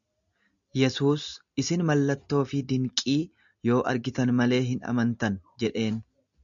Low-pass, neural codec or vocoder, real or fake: 7.2 kHz; none; real